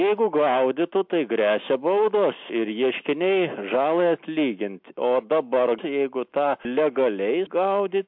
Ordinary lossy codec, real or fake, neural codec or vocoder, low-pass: MP3, 48 kbps; real; none; 5.4 kHz